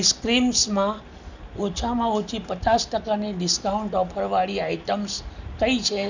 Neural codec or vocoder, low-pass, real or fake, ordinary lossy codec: none; 7.2 kHz; real; none